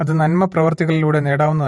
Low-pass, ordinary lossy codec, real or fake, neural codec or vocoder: 19.8 kHz; MP3, 48 kbps; fake; vocoder, 48 kHz, 128 mel bands, Vocos